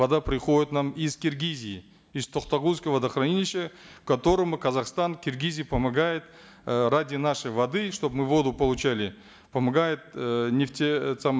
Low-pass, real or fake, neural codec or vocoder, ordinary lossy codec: none; real; none; none